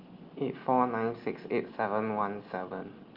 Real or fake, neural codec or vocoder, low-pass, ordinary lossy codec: real; none; 5.4 kHz; Opus, 32 kbps